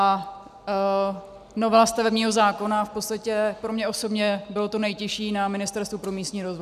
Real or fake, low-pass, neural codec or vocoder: real; 14.4 kHz; none